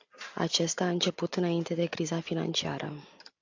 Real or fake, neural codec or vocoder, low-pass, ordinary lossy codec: real; none; 7.2 kHz; AAC, 48 kbps